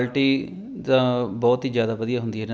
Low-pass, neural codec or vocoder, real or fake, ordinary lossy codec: none; none; real; none